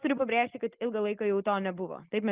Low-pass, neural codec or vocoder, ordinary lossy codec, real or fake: 3.6 kHz; none; Opus, 32 kbps; real